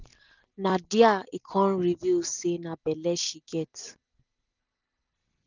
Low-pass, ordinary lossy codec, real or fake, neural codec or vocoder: 7.2 kHz; none; real; none